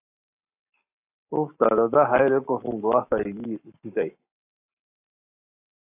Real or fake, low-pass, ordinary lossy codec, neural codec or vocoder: fake; 3.6 kHz; AAC, 32 kbps; codec, 44.1 kHz, 7.8 kbps, Pupu-Codec